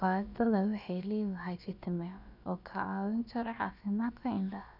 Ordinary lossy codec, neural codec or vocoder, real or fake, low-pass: none; codec, 16 kHz, about 1 kbps, DyCAST, with the encoder's durations; fake; 5.4 kHz